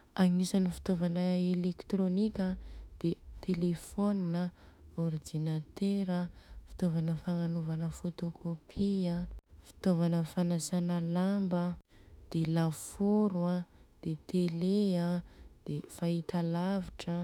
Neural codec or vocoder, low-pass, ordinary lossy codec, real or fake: autoencoder, 48 kHz, 32 numbers a frame, DAC-VAE, trained on Japanese speech; 19.8 kHz; none; fake